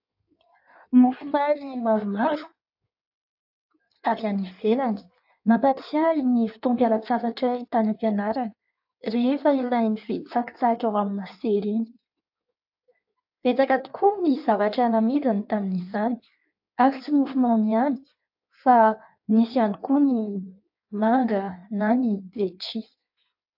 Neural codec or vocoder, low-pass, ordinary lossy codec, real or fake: codec, 16 kHz in and 24 kHz out, 1.1 kbps, FireRedTTS-2 codec; 5.4 kHz; AAC, 48 kbps; fake